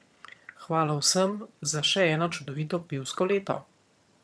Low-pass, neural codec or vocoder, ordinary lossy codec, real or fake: none; vocoder, 22.05 kHz, 80 mel bands, HiFi-GAN; none; fake